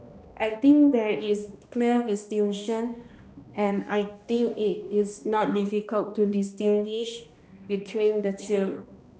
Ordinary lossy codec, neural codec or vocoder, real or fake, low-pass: none; codec, 16 kHz, 1 kbps, X-Codec, HuBERT features, trained on balanced general audio; fake; none